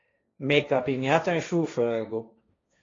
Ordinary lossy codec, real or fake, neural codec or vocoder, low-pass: AAC, 32 kbps; fake; codec, 16 kHz, 1.1 kbps, Voila-Tokenizer; 7.2 kHz